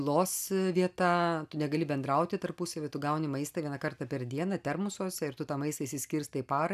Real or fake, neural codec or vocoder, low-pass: real; none; 14.4 kHz